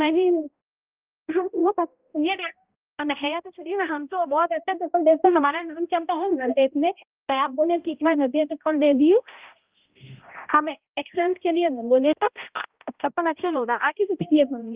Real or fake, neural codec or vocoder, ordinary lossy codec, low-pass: fake; codec, 16 kHz, 0.5 kbps, X-Codec, HuBERT features, trained on balanced general audio; Opus, 32 kbps; 3.6 kHz